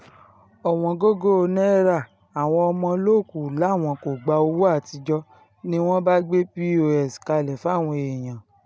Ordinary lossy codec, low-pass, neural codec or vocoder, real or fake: none; none; none; real